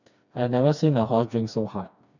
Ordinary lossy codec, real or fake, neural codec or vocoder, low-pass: none; fake; codec, 16 kHz, 2 kbps, FreqCodec, smaller model; 7.2 kHz